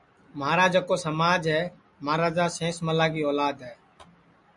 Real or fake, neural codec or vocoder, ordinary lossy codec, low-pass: real; none; MP3, 96 kbps; 10.8 kHz